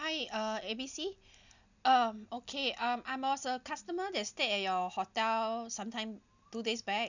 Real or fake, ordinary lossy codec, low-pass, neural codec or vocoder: real; none; 7.2 kHz; none